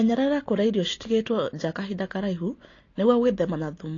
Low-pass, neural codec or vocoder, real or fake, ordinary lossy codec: 7.2 kHz; none; real; AAC, 32 kbps